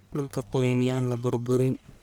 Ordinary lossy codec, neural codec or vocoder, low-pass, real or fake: none; codec, 44.1 kHz, 1.7 kbps, Pupu-Codec; none; fake